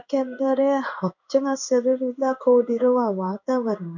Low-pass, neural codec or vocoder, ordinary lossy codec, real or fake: 7.2 kHz; codec, 16 kHz in and 24 kHz out, 1 kbps, XY-Tokenizer; none; fake